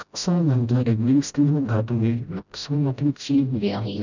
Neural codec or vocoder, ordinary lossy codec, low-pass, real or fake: codec, 16 kHz, 0.5 kbps, FreqCodec, smaller model; none; 7.2 kHz; fake